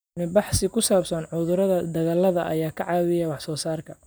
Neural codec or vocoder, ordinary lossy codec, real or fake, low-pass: none; none; real; none